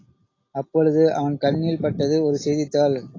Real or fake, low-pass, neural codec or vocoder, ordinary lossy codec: real; 7.2 kHz; none; AAC, 32 kbps